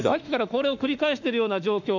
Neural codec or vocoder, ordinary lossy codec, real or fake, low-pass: autoencoder, 48 kHz, 32 numbers a frame, DAC-VAE, trained on Japanese speech; none; fake; 7.2 kHz